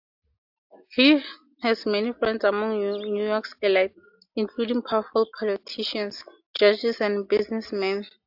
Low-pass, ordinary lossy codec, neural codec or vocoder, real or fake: 5.4 kHz; AAC, 48 kbps; none; real